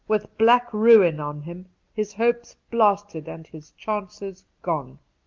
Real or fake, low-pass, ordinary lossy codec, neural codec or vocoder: real; 7.2 kHz; Opus, 24 kbps; none